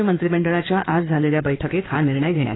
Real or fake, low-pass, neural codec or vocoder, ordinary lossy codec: fake; 7.2 kHz; codec, 16 kHz in and 24 kHz out, 2.2 kbps, FireRedTTS-2 codec; AAC, 16 kbps